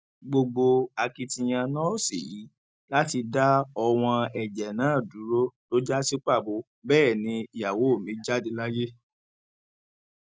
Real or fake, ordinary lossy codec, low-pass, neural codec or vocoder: real; none; none; none